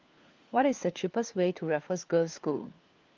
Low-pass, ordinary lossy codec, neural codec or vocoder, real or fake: 7.2 kHz; Opus, 32 kbps; codec, 16 kHz, 4 kbps, FunCodec, trained on LibriTTS, 50 frames a second; fake